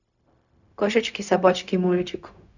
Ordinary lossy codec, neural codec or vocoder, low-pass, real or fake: none; codec, 16 kHz, 0.4 kbps, LongCat-Audio-Codec; 7.2 kHz; fake